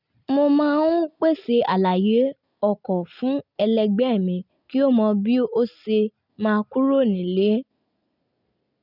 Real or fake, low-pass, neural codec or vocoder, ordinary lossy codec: real; 5.4 kHz; none; none